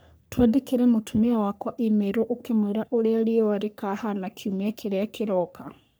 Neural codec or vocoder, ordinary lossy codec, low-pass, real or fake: codec, 44.1 kHz, 3.4 kbps, Pupu-Codec; none; none; fake